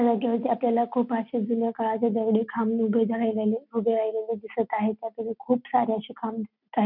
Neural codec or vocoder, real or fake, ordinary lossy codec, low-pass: none; real; none; 5.4 kHz